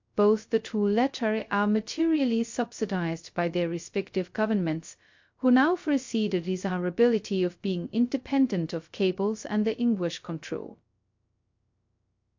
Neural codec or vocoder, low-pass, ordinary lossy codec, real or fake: codec, 16 kHz, 0.2 kbps, FocalCodec; 7.2 kHz; MP3, 48 kbps; fake